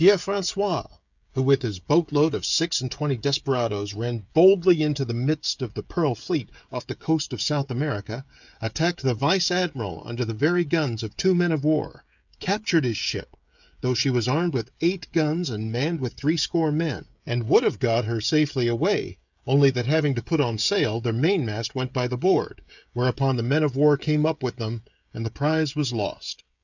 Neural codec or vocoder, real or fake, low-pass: codec, 16 kHz, 16 kbps, FreqCodec, smaller model; fake; 7.2 kHz